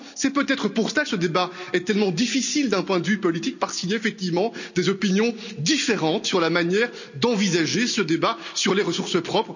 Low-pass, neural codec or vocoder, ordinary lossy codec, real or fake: 7.2 kHz; none; none; real